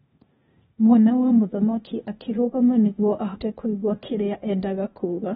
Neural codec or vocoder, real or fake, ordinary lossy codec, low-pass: codec, 16 kHz, 0.8 kbps, ZipCodec; fake; AAC, 16 kbps; 7.2 kHz